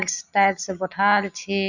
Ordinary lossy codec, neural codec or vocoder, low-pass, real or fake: none; none; 7.2 kHz; real